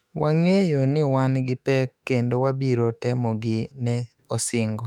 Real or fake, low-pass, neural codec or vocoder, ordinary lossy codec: fake; 19.8 kHz; autoencoder, 48 kHz, 32 numbers a frame, DAC-VAE, trained on Japanese speech; none